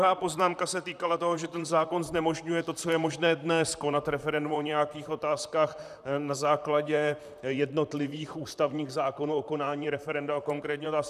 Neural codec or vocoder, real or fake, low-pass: vocoder, 44.1 kHz, 128 mel bands, Pupu-Vocoder; fake; 14.4 kHz